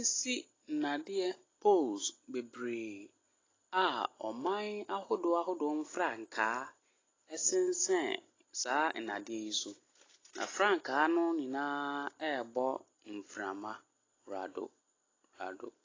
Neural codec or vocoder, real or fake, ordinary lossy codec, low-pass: none; real; AAC, 32 kbps; 7.2 kHz